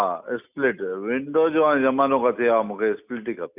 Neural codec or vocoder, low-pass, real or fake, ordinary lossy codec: none; 3.6 kHz; real; none